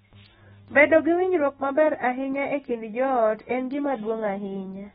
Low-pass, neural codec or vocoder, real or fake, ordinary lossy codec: 19.8 kHz; autoencoder, 48 kHz, 128 numbers a frame, DAC-VAE, trained on Japanese speech; fake; AAC, 16 kbps